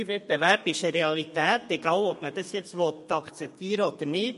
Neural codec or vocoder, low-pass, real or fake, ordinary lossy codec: codec, 32 kHz, 1.9 kbps, SNAC; 14.4 kHz; fake; MP3, 48 kbps